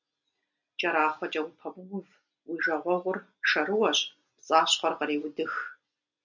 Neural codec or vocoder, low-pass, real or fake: none; 7.2 kHz; real